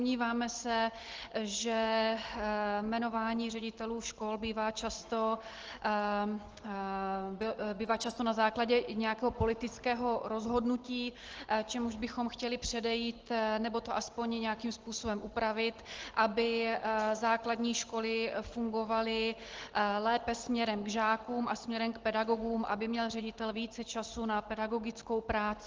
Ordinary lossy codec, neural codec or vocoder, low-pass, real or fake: Opus, 16 kbps; none; 7.2 kHz; real